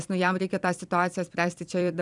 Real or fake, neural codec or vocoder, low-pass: fake; vocoder, 44.1 kHz, 128 mel bands every 256 samples, BigVGAN v2; 10.8 kHz